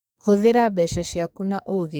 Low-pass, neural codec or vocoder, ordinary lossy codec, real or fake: none; codec, 44.1 kHz, 2.6 kbps, SNAC; none; fake